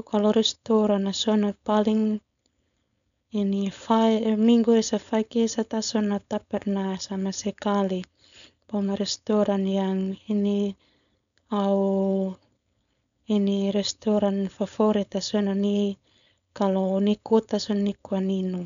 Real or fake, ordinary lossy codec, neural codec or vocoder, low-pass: fake; none; codec, 16 kHz, 4.8 kbps, FACodec; 7.2 kHz